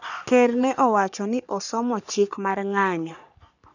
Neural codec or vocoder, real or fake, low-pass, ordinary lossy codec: codec, 44.1 kHz, 3.4 kbps, Pupu-Codec; fake; 7.2 kHz; none